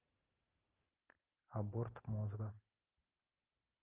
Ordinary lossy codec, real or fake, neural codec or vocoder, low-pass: Opus, 24 kbps; real; none; 3.6 kHz